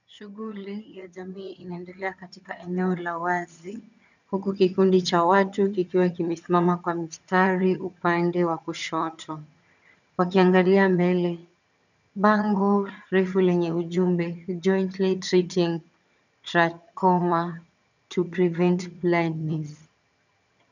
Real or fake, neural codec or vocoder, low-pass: fake; vocoder, 22.05 kHz, 80 mel bands, HiFi-GAN; 7.2 kHz